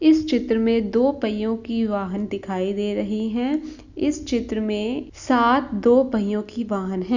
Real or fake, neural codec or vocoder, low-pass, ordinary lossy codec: real; none; 7.2 kHz; none